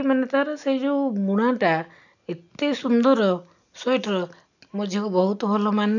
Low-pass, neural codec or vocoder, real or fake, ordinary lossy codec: 7.2 kHz; none; real; none